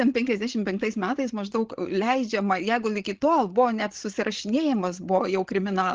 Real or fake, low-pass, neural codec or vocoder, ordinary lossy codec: fake; 7.2 kHz; codec, 16 kHz, 16 kbps, FreqCodec, larger model; Opus, 16 kbps